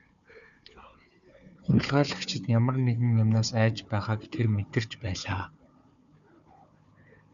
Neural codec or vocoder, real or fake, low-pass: codec, 16 kHz, 4 kbps, FunCodec, trained on Chinese and English, 50 frames a second; fake; 7.2 kHz